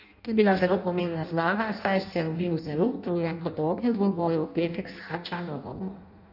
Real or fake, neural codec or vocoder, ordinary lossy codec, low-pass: fake; codec, 16 kHz in and 24 kHz out, 0.6 kbps, FireRedTTS-2 codec; none; 5.4 kHz